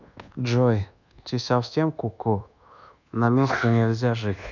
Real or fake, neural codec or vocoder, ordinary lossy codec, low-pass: fake; codec, 24 kHz, 1.2 kbps, DualCodec; none; 7.2 kHz